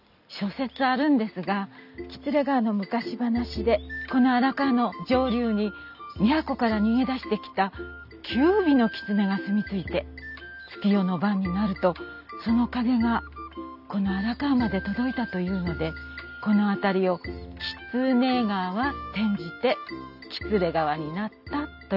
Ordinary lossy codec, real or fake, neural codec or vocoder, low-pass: none; real; none; 5.4 kHz